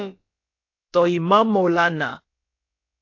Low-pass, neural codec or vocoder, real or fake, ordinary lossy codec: 7.2 kHz; codec, 16 kHz, about 1 kbps, DyCAST, with the encoder's durations; fake; AAC, 48 kbps